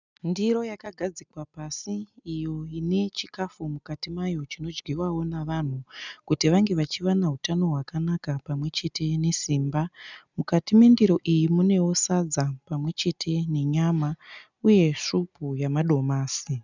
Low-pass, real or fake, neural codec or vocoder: 7.2 kHz; real; none